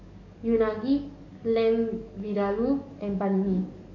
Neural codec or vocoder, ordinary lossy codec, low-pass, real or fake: codec, 44.1 kHz, 7.8 kbps, DAC; none; 7.2 kHz; fake